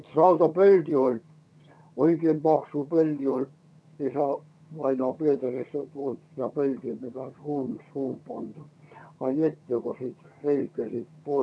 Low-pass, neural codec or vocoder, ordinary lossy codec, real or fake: none; vocoder, 22.05 kHz, 80 mel bands, HiFi-GAN; none; fake